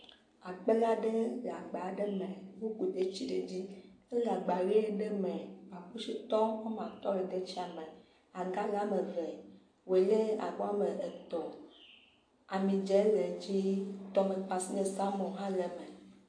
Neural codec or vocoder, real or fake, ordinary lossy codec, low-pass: none; real; AAC, 48 kbps; 9.9 kHz